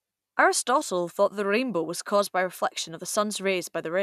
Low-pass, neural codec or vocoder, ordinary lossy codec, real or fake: 14.4 kHz; none; none; real